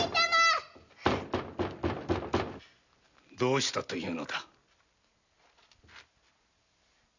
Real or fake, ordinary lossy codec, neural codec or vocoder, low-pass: real; none; none; 7.2 kHz